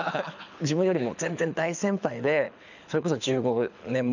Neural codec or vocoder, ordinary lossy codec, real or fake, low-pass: codec, 24 kHz, 6 kbps, HILCodec; none; fake; 7.2 kHz